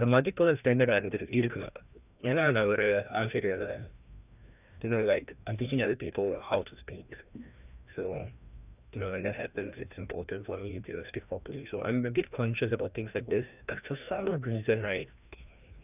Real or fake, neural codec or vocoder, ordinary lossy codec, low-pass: fake; codec, 16 kHz, 1 kbps, FreqCodec, larger model; none; 3.6 kHz